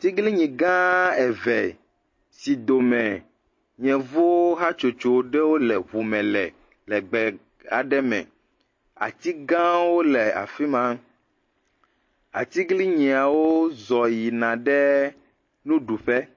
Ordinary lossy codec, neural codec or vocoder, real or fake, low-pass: MP3, 32 kbps; none; real; 7.2 kHz